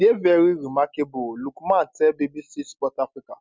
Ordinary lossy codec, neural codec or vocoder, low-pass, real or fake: none; none; none; real